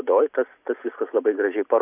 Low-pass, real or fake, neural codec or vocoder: 3.6 kHz; real; none